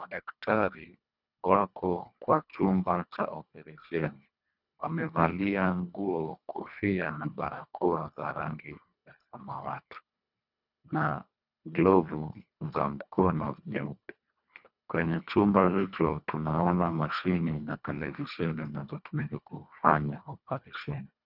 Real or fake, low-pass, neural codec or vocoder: fake; 5.4 kHz; codec, 24 kHz, 1.5 kbps, HILCodec